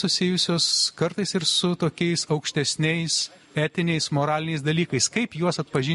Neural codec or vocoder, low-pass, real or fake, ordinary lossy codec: none; 14.4 kHz; real; MP3, 48 kbps